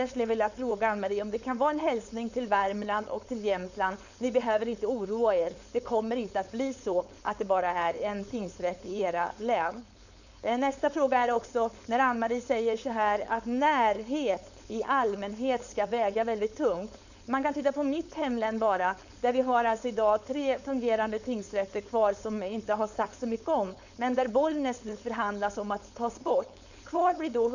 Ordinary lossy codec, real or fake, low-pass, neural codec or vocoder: none; fake; 7.2 kHz; codec, 16 kHz, 4.8 kbps, FACodec